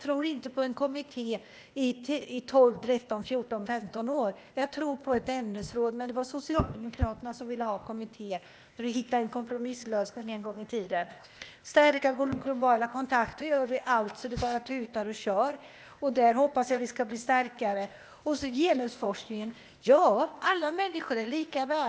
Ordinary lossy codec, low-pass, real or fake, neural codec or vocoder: none; none; fake; codec, 16 kHz, 0.8 kbps, ZipCodec